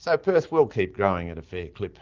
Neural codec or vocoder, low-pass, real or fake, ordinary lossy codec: none; 7.2 kHz; real; Opus, 32 kbps